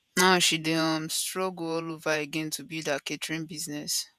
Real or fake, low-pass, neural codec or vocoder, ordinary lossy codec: fake; 14.4 kHz; vocoder, 48 kHz, 128 mel bands, Vocos; none